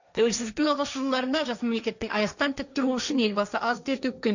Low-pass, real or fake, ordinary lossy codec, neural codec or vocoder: 7.2 kHz; fake; none; codec, 16 kHz, 1.1 kbps, Voila-Tokenizer